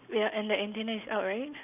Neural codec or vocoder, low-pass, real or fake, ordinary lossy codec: none; 3.6 kHz; real; none